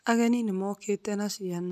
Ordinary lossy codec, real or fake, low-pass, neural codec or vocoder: none; real; 14.4 kHz; none